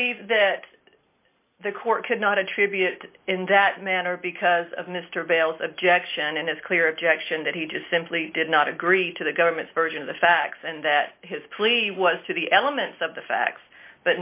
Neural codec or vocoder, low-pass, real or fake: none; 3.6 kHz; real